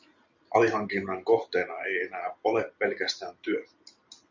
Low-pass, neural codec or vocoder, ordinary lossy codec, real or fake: 7.2 kHz; none; Opus, 64 kbps; real